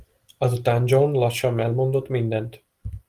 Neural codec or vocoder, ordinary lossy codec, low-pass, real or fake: none; Opus, 24 kbps; 14.4 kHz; real